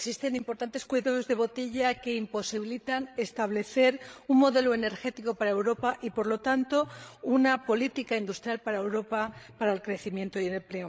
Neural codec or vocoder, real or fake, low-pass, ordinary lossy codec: codec, 16 kHz, 16 kbps, FreqCodec, larger model; fake; none; none